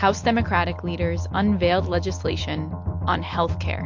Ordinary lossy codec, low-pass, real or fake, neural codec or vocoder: MP3, 48 kbps; 7.2 kHz; real; none